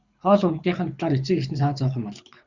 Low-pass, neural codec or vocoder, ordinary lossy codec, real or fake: 7.2 kHz; codec, 24 kHz, 6 kbps, HILCodec; Opus, 64 kbps; fake